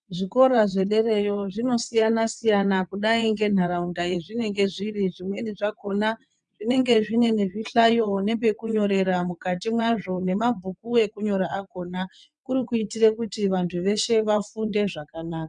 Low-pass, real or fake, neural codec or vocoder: 9.9 kHz; fake; vocoder, 22.05 kHz, 80 mel bands, WaveNeXt